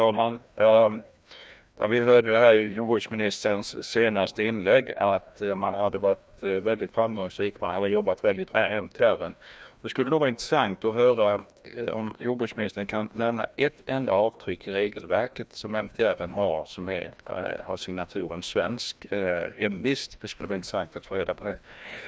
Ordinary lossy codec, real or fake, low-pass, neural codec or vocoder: none; fake; none; codec, 16 kHz, 1 kbps, FreqCodec, larger model